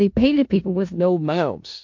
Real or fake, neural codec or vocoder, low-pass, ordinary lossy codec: fake; codec, 16 kHz in and 24 kHz out, 0.4 kbps, LongCat-Audio-Codec, four codebook decoder; 7.2 kHz; MP3, 48 kbps